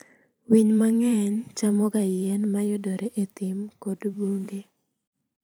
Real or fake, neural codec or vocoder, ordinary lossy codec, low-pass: fake; vocoder, 44.1 kHz, 128 mel bands every 512 samples, BigVGAN v2; none; none